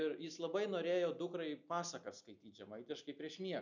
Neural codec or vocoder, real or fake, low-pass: none; real; 7.2 kHz